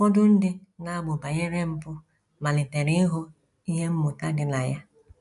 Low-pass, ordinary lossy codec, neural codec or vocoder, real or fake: 10.8 kHz; none; none; real